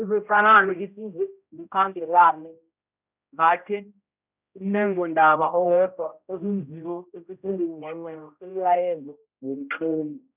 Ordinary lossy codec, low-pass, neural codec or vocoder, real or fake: none; 3.6 kHz; codec, 16 kHz, 0.5 kbps, X-Codec, HuBERT features, trained on general audio; fake